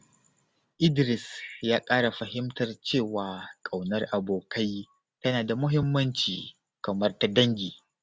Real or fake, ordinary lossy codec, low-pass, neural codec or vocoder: real; none; none; none